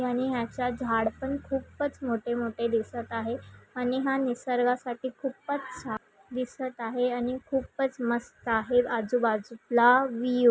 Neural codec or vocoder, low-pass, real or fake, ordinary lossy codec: none; none; real; none